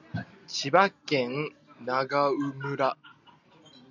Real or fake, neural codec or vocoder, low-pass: real; none; 7.2 kHz